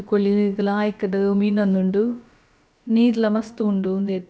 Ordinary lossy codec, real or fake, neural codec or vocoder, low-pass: none; fake; codec, 16 kHz, about 1 kbps, DyCAST, with the encoder's durations; none